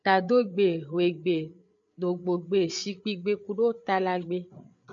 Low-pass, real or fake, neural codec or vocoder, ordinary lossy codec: 7.2 kHz; fake; codec, 16 kHz, 8 kbps, FreqCodec, larger model; MP3, 48 kbps